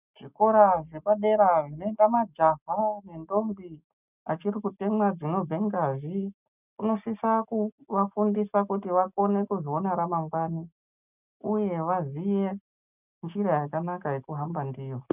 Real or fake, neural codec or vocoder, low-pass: real; none; 3.6 kHz